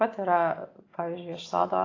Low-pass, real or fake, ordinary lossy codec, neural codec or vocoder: 7.2 kHz; real; AAC, 32 kbps; none